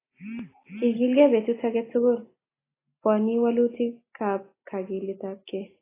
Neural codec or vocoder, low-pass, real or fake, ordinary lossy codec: none; 3.6 kHz; real; AAC, 16 kbps